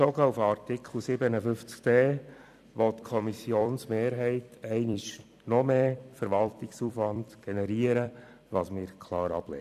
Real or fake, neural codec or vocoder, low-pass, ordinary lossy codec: fake; vocoder, 44.1 kHz, 128 mel bands every 256 samples, BigVGAN v2; 14.4 kHz; none